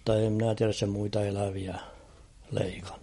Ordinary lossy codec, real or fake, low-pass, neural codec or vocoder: MP3, 48 kbps; real; 19.8 kHz; none